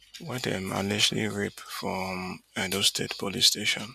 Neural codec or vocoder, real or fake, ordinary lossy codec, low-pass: none; real; none; 14.4 kHz